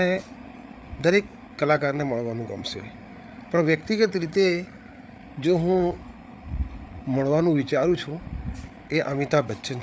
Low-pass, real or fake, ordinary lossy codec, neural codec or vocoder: none; fake; none; codec, 16 kHz, 8 kbps, FreqCodec, larger model